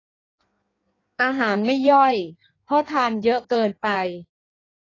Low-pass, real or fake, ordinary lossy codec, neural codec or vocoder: 7.2 kHz; fake; AAC, 32 kbps; codec, 16 kHz in and 24 kHz out, 1.1 kbps, FireRedTTS-2 codec